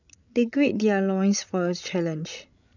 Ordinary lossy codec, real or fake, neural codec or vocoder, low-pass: none; fake; codec, 16 kHz, 16 kbps, FreqCodec, larger model; 7.2 kHz